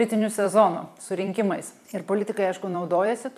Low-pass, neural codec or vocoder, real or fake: 14.4 kHz; vocoder, 44.1 kHz, 128 mel bands every 256 samples, BigVGAN v2; fake